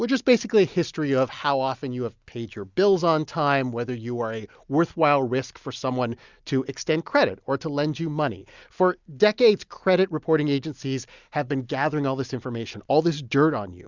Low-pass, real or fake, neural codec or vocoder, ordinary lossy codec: 7.2 kHz; real; none; Opus, 64 kbps